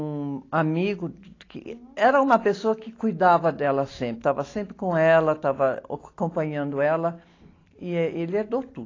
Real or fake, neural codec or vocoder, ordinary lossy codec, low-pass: real; none; AAC, 32 kbps; 7.2 kHz